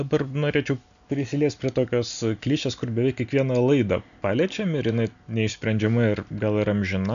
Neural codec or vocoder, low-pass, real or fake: none; 7.2 kHz; real